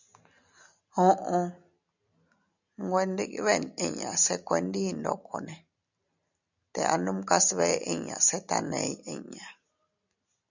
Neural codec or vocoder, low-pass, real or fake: none; 7.2 kHz; real